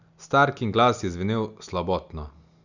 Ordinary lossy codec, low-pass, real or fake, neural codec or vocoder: none; 7.2 kHz; real; none